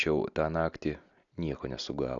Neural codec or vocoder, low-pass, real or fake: none; 7.2 kHz; real